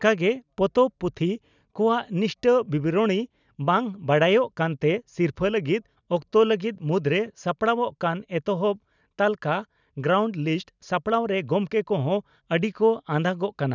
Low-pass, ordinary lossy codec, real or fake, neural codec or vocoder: 7.2 kHz; none; real; none